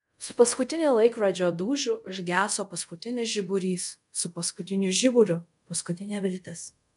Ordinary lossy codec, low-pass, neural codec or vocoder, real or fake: MP3, 96 kbps; 10.8 kHz; codec, 24 kHz, 0.5 kbps, DualCodec; fake